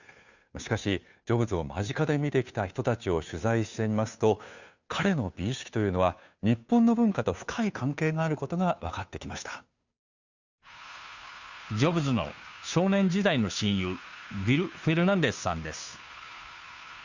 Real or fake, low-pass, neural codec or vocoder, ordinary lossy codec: fake; 7.2 kHz; codec, 16 kHz, 2 kbps, FunCodec, trained on Chinese and English, 25 frames a second; none